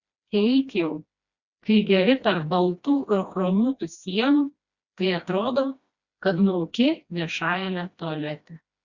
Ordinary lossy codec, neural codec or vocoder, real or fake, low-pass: Opus, 64 kbps; codec, 16 kHz, 1 kbps, FreqCodec, smaller model; fake; 7.2 kHz